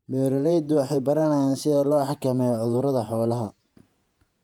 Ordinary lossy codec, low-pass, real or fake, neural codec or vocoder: none; 19.8 kHz; fake; vocoder, 48 kHz, 128 mel bands, Vocos